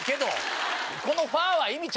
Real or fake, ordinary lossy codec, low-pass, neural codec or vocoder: real; none; none; none